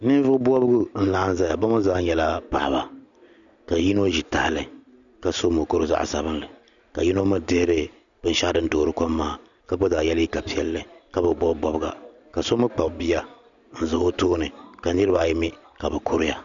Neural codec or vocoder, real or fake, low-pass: none; real; 7.2 kHz